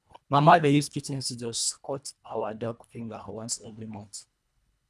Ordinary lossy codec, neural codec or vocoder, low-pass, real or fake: none; codec, 24 kHz, 1.5 kbps, HILCodec; none; fake